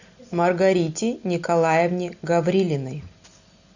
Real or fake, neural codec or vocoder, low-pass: real; none; 7.2 kHz